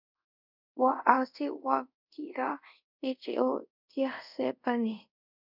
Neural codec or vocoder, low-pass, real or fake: codec, 24 kHz, 0.5 kbps, DualCodec; 5.4 kHz; fake